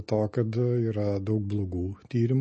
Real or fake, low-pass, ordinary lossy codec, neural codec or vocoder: real; 10.8 kHz; MP3, 32 kbps; none